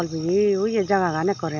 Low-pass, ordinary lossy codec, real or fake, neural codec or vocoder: 7.2 kHz; none; real; none